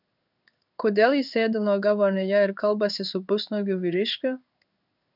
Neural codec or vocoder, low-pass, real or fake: codec, 16 kHz in and 24 kHz out, 1 kbps, XY-Tokenizer; 5.4 kHz; fake